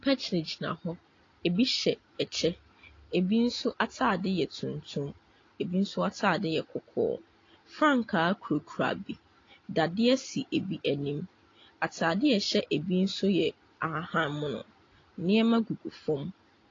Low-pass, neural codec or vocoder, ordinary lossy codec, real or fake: 7.2 kHz; none; AAC, 32 kbps; real